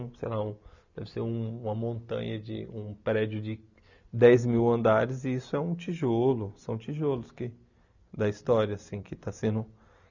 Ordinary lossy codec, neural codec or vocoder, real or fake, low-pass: none; none; real; 7.2 kHz